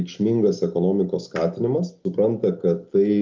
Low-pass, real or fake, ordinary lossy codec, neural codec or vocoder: 7.2 kHz; real; Opus, 32 kbps; none